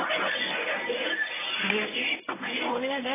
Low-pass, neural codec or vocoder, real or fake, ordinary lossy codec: 3.6 kHz; codec, 24 kHz, 0.9 kbps, WavTokenizer, medium speech release version 1; fake; AAC, 16 kbps